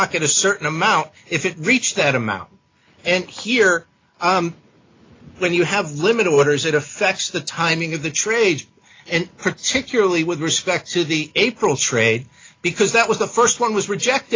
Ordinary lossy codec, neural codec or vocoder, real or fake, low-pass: AAC, 32 kbps; none; real; 7.2 kHz